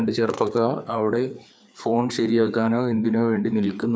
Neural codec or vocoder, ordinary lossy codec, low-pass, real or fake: codec, 16 kHz, 4 kbps, FreqCodec, larger model; none; none; fake